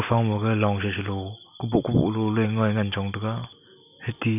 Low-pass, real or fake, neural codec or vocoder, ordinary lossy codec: 3.6 kHz; real; none; none